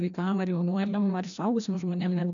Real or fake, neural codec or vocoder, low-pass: fake; codec, 16 kHz, 1 kbps, FreqCodec, larger model; 7.2 kHz